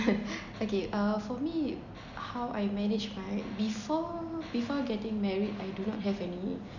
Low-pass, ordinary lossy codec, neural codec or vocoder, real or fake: 7.2 kHz; none; none; real